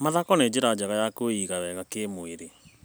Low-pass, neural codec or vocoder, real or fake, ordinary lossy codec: none; none; real; none